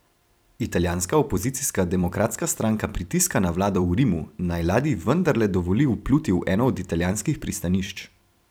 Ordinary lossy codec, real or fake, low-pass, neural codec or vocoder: none; fake; none; vocoder, 44.1 kHz, 128 mel bands every 256 samples, BigVGAN v2